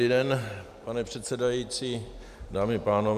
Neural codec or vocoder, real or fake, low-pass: vocoder, 44.1 kHz, 128 mel bands every 512 samples, BigVGAN v2; fake; 14.4 kHz